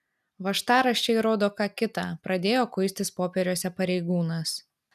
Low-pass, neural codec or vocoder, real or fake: 14.4 kHz; none; real